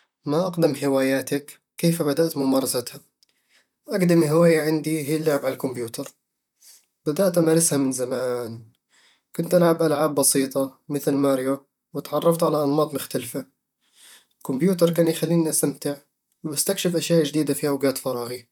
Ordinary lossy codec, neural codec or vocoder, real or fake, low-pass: none; vocoder, 44.1 kHz, 128 mel bands, Pupu-Vocoder; fake; 19.8 kHz